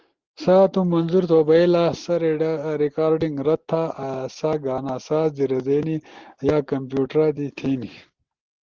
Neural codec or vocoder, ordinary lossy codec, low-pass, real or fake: none; Opus, 16 kbps; 7.2 kHz; real